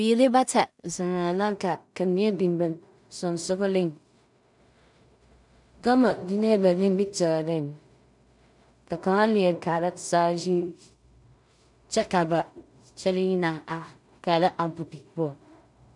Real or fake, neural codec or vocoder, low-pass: fake; codec, 16 kHz in and 24 kHz out, 0.4 kbps, LongCat-Audio-Codec, two codebook decoder; 10.8 kHz